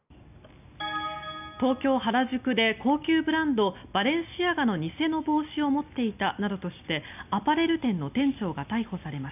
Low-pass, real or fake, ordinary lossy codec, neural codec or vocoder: 3.6 kHz; real; none; none